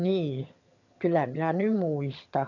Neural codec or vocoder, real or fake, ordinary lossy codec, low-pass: vocoder, 22.05 kHz, 80 mel bands, HiFi-GAN; fake; none; 7.2 kHz